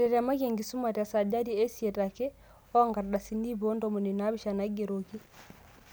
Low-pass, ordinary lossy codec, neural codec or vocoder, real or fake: none; none; none; real